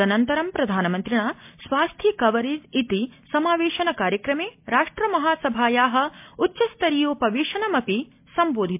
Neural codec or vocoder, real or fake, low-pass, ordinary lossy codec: none; real; 3.6 kHz; MP3, 32 kbps